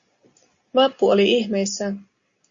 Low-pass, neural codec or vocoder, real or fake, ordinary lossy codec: 7.2 kHz; none; real; Opus, 64 kbps